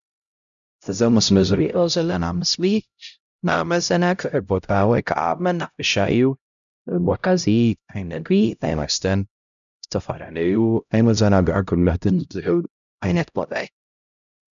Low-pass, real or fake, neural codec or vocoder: 7.2 kHz; fake; codec, 16 kHz, 0.5 kbps, X-Codec, HuBERT features, trained on LibriSpeech